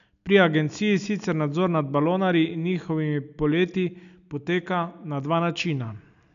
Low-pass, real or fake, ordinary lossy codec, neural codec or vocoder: 7.2 kHz; real; none; none